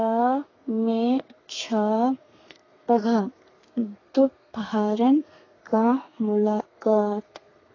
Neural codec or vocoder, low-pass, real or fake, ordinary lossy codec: codec, 32 kHz, 1.9 kbps, SNAC; 7.2 kHz; fake; AAC, 32 kbps